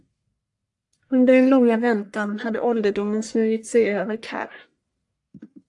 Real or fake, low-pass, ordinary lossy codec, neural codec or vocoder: fake; 10.8 kHz; AAC, 64 kbps; codec, 44.1 kHz, 1.7 kbps, Pupu-Codec